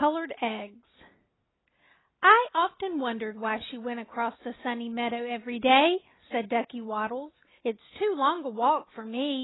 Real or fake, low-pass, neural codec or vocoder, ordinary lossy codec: real; 7.2 kHz; none; AAC, 16 kbps